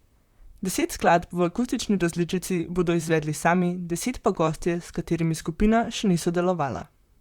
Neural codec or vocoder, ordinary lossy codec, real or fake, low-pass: vocoder, 44.1 kHz, 128 mel bands, Pupu-Vocoder; Opus, 64 kbps; fake; 19.8 kHz